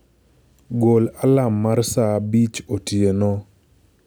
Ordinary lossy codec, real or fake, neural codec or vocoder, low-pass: none; real; none; none